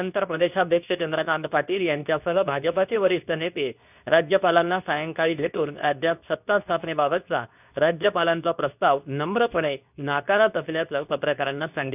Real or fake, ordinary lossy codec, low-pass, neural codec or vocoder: fake; none; 3.6 kHz; codec, 24 kHz, 0.9 kbps, WavTokenizer, medium speech release version 2